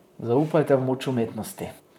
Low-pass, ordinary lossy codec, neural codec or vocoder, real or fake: 19.8 kHz; none; vocoder, 44.1 kHz, 128 mel bands, Pupu-Vocoder; fake